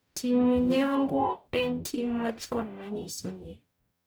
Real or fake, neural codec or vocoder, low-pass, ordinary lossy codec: fake; codec, 44.1 kHz, 0.9 kbps, DAC; none; none